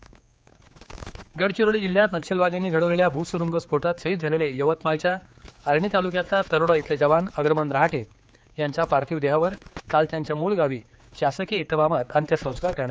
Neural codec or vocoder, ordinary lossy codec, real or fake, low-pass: codec, 16 kHz, 4 kbps, X-Codec, HuBERT features, trained on general audio; none; fake; none